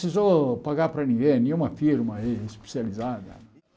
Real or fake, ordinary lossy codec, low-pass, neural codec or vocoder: real; none; none; none